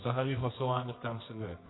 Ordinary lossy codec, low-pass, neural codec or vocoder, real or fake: AAC, 16 kbps; 7.2 kHz; codec, 24 kHz, 0.9 kbps, WavTokenizer, medium music audio release; fake